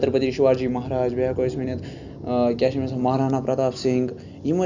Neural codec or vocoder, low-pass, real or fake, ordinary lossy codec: none; 7.2 kHz; real; none